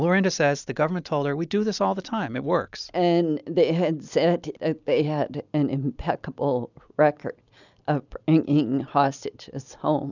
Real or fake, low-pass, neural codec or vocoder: real; 7.2 kHz; none